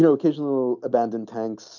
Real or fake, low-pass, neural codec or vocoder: real; 7.2 kHz; none